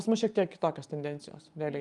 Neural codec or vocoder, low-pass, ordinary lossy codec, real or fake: none; 10.8 kHz; Opus, 32 kbps; real